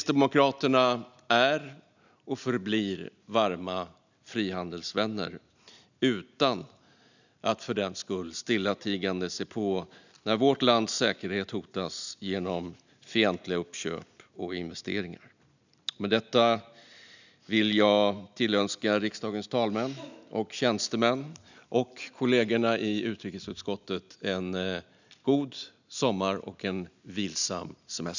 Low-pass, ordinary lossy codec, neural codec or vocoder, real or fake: 7.2 kHz; none; none; real